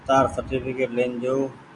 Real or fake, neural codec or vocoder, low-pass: real; none; 10.8 kHz